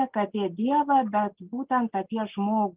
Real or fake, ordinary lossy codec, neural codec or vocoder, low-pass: real; Opus, 32 kbps; none; 3.6 kHz